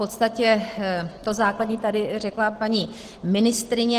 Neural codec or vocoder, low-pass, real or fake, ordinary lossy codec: none; 14.4 kHz; real; Opus, 16 kbps